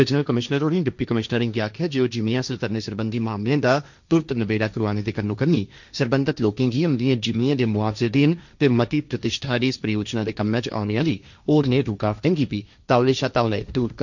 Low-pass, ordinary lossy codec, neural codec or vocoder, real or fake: 7.2 kHz; none; codec, 16 kHz, 1.1 kbps, Voila-Tokenizer; fake